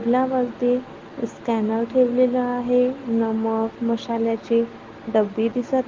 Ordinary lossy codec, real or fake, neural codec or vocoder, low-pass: Opus, 16 kbps; real; none; 7.2 kHz